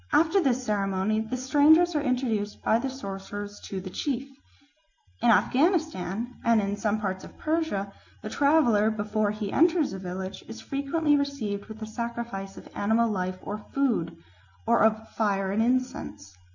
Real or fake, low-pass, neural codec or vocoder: real; 7.2 kHz; none